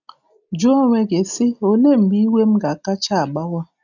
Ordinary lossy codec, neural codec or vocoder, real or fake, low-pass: none; none; real; 7.2 kHz